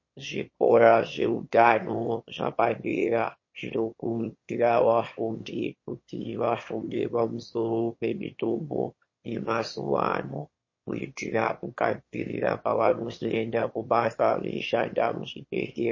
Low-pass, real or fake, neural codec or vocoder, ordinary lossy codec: 7.2 kHz; fake; autoencoder, 22.05 kHz, a latent of 192 numbers a frame, VITS, trained on one speaker; MP3, 32 kbps